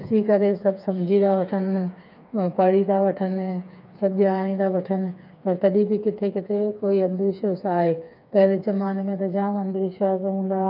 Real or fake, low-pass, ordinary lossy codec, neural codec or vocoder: fake; 5.4 kHz; none; codec, 16 kHz, 4 kbps, FreqCodec, smaller model